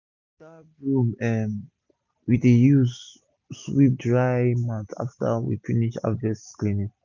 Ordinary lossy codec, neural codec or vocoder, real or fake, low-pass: none; none; real; 7.2 kHz